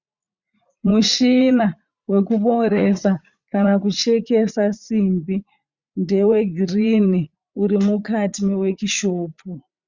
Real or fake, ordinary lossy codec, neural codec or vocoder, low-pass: fake; Opus, 64 kbps; vocoder, 44.1 kHz, 80 mel bands, Vocos; 7.2 kHz